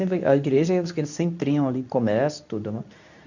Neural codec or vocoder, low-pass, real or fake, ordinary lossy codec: codec, 24 kHz, 0.9 kbps, WavTokenizer, medium speech release version 2; 7.2 kHz; fake; none